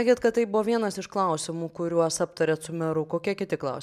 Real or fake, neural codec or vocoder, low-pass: real; none; 14.4 kHz